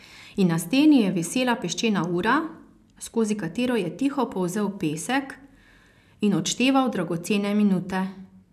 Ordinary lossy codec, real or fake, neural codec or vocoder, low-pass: none; real; none; 14.4 kHz